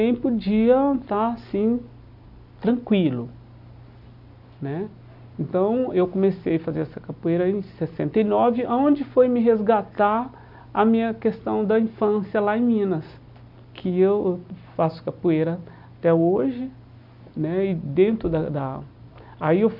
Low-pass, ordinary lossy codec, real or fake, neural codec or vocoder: 5.4 kHz; none; real; none